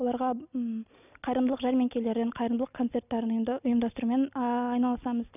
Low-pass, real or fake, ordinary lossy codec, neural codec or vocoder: 3.6 kHz; real; none; none